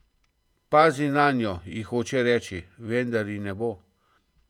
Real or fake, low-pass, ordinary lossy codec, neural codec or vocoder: fake; 19.8 kHz; none; vocoder, 48 kHz, 128 mel bands, Vocos